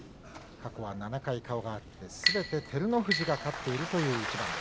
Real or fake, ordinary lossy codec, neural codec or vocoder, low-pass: real; none; none; none